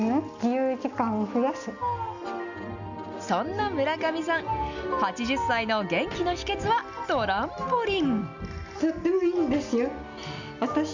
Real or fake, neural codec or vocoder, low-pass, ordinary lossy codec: real; none; 7.2 kHz; none